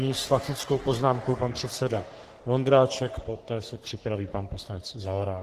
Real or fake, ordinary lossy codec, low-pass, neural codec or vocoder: fake; Opus, 16 kbps; 14.4 kHz; codec, 44.1 kHz, 3.4 kbps, Pupu-Codec